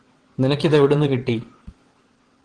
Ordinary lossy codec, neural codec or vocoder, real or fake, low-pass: Opus, 16 kbps; vocoder, 22.05 kHz, 80 mel bands, WaveNeXt; fake; 9.9 kHz